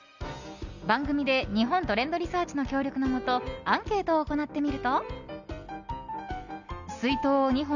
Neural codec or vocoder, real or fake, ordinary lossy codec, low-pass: none; real; none; 7.2 kHz